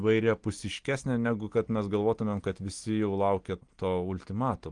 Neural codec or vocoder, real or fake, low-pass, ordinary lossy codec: none; real; 9.9 kHz; Opus, 24 kbps